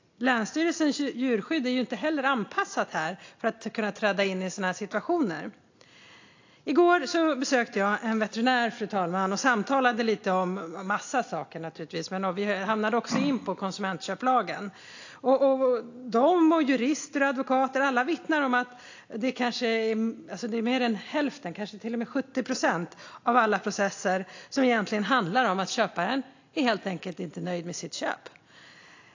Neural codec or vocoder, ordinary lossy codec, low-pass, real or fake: none; AAC, 48 kbps; 7.2 kHz; real